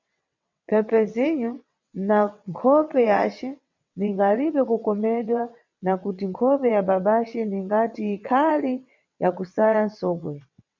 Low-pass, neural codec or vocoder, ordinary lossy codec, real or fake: 7.2 kHz; vocoder, 22.05 kHz, 80 mel bands, WaveNeXt; MP3, 64 kbps; fake